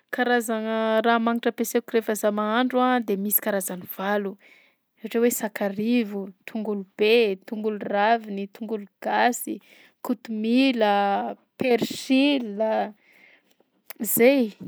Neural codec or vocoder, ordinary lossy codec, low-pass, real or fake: none; none; none; real